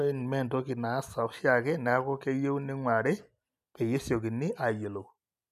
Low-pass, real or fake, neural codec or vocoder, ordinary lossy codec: 14.4 kHz; real; none; none